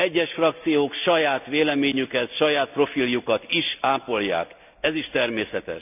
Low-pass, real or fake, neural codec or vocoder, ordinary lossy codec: 3.6 kHz; real; none; none